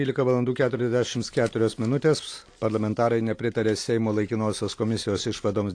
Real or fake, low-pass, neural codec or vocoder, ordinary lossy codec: real; 9.9 kHz; none; AAC, 48 kbps